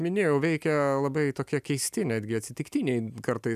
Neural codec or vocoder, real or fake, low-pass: none; real; 14.4 kHz